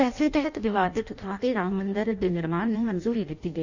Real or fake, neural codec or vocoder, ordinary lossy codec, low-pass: fake; codec, 16 kHz in and 24 kHz out, 0.6 kbps, FireRedTTS-2 codec; none; 7.2 kHz